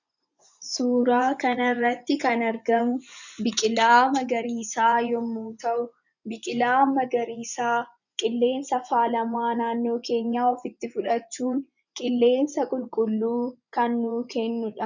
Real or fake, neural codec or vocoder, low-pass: fake; vocoder, 24 kHz, 100 mel bands, Vocos; 7.2 kHz